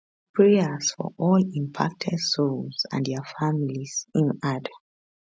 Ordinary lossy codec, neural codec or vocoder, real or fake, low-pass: none; none; real; none